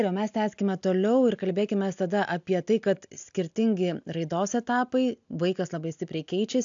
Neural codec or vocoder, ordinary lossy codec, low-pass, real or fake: none; AAC, 64 kbps; 7.2 kHz; real